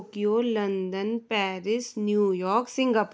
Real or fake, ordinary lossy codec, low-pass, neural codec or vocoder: real; none; none; none